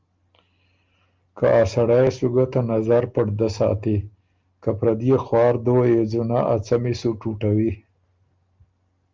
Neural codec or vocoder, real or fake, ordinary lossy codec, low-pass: none; real; Opus, 24 kbps; 7.2 kHz